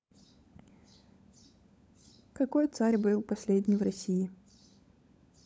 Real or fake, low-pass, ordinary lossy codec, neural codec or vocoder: fake; none; none; codec, 16 kHz, 16 kbps, FunCodec, trained on LibriTTS, 50 frames a second